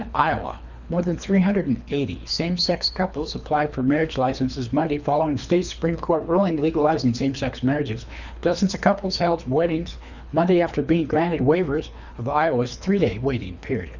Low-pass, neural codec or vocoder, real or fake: 7.2 kHz; codec, 24 kHz, 3 kbps, HILCodec; fake